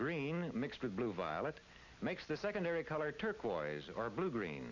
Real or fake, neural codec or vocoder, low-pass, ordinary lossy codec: real; none; 7.2 kHz; MP3, 48 kbps